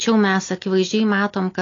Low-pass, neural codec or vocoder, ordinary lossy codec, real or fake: 7.2 kHz; none; AAC, 32 kbps; real